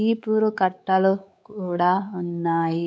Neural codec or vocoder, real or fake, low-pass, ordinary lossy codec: codec, 16 kHz, 4 kbps, X-Codec, WavLM features, trained on Multilingual LibriSpeech; fake; none; none